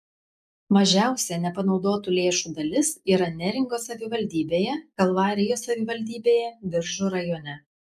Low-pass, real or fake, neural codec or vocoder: 14.4 kHz; real; none